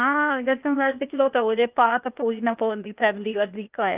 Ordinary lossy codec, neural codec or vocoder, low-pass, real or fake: Opus, 24 kbps; codec, 16 kHz, 0.8 kbps, ZipCodec; 3.6 kHz; fake